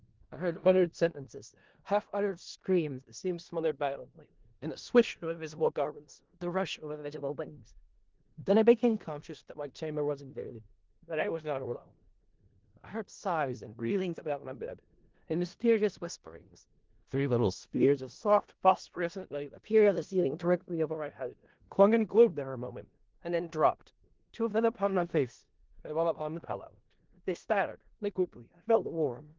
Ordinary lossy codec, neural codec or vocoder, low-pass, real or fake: Opus, 16 kbps; codec, 16 kHz in and 24 kHz out, 0.4 kbps, LongCat-Audio-Codec, four codebook decoder; 7.2 kHz; fake